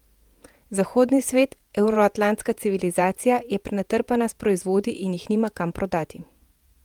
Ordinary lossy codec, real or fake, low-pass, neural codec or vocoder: Opus, 32 kbps; fake; 19.8 kHz; vocoder, 48 kHz, 128 mel bands, Vocos